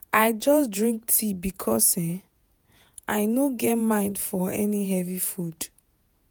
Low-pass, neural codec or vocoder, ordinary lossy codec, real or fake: none; vocoder, 48 kHz, 128 mel bands, Vocos; none; fake